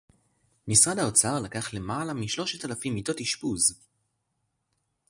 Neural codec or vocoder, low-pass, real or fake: none; 10.8 kHz; real